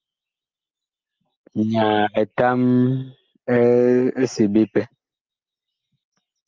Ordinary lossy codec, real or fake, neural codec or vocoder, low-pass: Opus, 24 kbps; real; none; 7.2 kHz